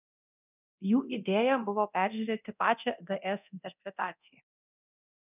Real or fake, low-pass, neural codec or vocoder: fake; 3.6 kHz; codec, 24 kHz, 0.9 kbps, DualCodec